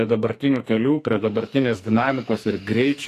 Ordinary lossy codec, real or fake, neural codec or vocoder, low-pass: AAC, 64 kbps; fake; codec, 44.1 kHz, 2.6 kbps, SNAC; 14.4 kHz